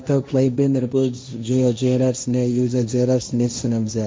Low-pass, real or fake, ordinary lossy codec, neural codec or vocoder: none; fake; none; codec, 16 kHz, 1.1 kbps, Voila-Tokenizer